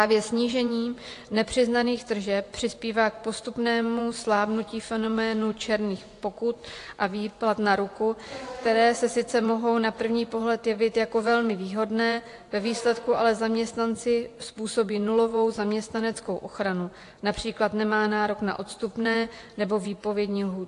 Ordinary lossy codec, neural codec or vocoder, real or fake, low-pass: AAC, 48 kbps; vocoder, 24 kHz, 100 mel bands, Vocos; fake; 10.8 kHz